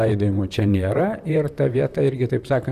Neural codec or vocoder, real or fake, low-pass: vocoder, 44.1 kHz, 128 mel bands, Pupu-Vocoder; fake; 14.4 kHz